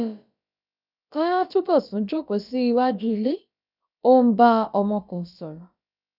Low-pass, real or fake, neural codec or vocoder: 5.4 kHz; fake; codec, 16 kHz, about 1 kbps, DyCAST, with the encoder's durations